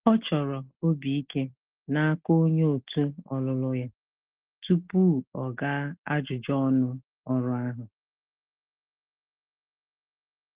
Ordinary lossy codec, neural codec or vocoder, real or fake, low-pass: Opus, 16 kbps; none; real; 3.6 kHz